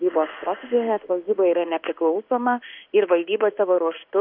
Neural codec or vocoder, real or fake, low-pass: codec, 16 kHz in and 24 kHz out, 1 kbps, XY-Tokenizer; fake; 5.4 kHz